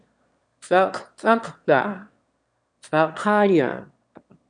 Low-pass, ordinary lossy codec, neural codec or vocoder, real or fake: 9.9 kHz; MP3, 64 kbps; autoencoder, 22.05 kHz, a latent of 192 numbers a frame, VITS, trained on one speaker; fake